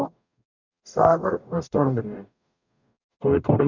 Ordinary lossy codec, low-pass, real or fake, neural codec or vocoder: none; 7.2 kHz; fake; codec, 44.1 kHz, 0.9 kbps, DAC